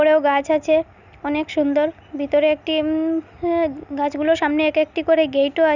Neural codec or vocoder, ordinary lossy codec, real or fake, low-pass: none; none; real; 7.2 kHz